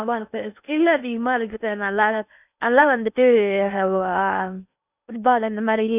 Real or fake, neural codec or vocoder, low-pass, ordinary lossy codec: fake; codec, 16 kHz in and 24 kHz out, 0.6 kbps, FocalCodec, streaming, 2048 codes; 3.6 kHz; none